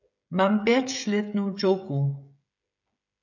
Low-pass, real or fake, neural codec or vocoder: 7.2 kHz; fake; codec, 16 kHz, 16 kbps, FreqCodec, smaller model